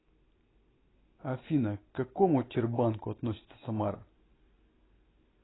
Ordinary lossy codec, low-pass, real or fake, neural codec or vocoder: AAC, 16 kbps; 7.2 kHz; real; none